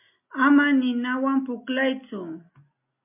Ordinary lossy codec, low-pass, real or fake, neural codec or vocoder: MP3, 32 kbps; 3.6 kHz; real; none